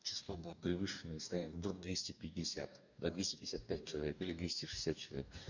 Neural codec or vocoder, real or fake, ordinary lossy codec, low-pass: codec, 44.1 kHz, 2.6 kbps, DAC; fake; none; 7.2 kHz